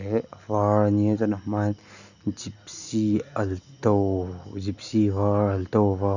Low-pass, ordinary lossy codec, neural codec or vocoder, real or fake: 7.2 kHz; none; none; real